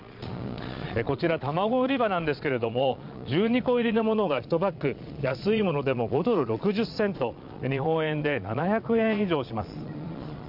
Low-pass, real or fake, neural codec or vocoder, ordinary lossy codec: 5.4 kHz; fake; vocoder, 22.05 kHz, 80 mel bands, Vocos; none